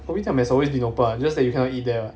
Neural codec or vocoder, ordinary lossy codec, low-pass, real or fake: none; none; none; real